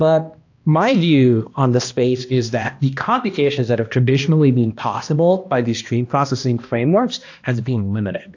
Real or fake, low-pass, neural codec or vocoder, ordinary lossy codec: fake; 7.2 kHz; codec, 16 kHz, 1 kbps, X-Codec, HuBERT features, trained on balanced general audio; AAC, 48 kbps